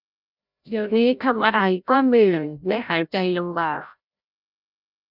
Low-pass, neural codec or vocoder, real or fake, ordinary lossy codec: 5.4 kHz; codec, 16 kHz, 0.5 kbps, FreqCodec, larger model; fake; none